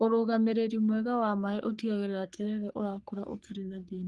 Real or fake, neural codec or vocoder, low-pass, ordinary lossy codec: fake; codec, 44.1 kHz, 3.4 kbps, Pupu-Codec; 10.8 kHz; Opus, 24 kbps